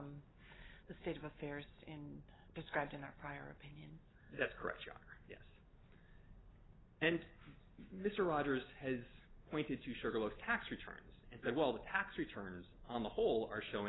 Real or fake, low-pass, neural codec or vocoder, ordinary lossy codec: real; 7.2 kHz; none; AAC, 16 kbps